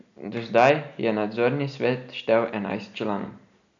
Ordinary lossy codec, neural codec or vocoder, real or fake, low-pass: none; none; real; 7.2 kHz